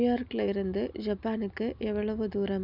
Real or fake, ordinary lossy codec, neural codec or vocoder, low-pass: real; none; none; 5.4 kHz